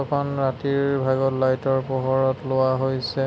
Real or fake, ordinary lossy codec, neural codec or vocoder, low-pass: real; none; none; none